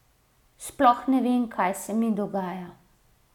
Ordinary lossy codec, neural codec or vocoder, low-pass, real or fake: none; none; 19.8 kHz; real